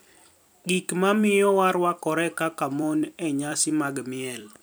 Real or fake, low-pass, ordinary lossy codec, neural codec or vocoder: fake; none; none; vocoder, 44.1 kHz, 128 mel bands every 256 samples, BigVGAN v2